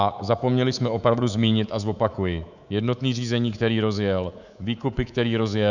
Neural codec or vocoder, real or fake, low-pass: codec, 24 kHz, 3.1 kbps, DualCodec; fake; 7.2 kHz